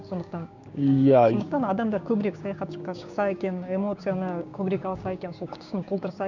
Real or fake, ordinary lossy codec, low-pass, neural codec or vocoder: fake; none; 7.2 kHz; codec, 16 kHz, 6 kbps, DAC